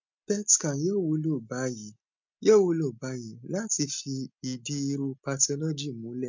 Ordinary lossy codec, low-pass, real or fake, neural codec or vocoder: MP3, 64 kbps; 7.2 kHz; real; none